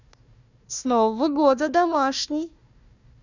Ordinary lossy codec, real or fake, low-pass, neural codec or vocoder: none; fake; 7.2 kHz; codec, 16 kHz, 1 kbps, FunCodec, trained on Chinese and English, 50 frames a second